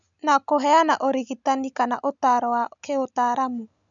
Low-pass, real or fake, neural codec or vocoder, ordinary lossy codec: 7.2 kHz; real; none; none